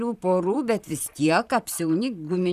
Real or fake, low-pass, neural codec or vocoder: fake; 14.4 kHz; vocoder, 44.1 kHz, 128 mel bands, Pupu-Vocoder